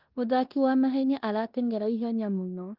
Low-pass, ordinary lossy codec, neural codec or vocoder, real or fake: 5.4 kHz; Opus, 24 kbps; codec, 16 kHz in and 24 kHz out, 0.9 kbps, LongCat-Audio-Codec, four codebook decoder; fake